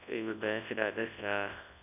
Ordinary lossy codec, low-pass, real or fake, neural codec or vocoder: none; 3.6 kHz; fake; codec, 24 kHz, 0.9 kbps, WavTokenizer, large speech release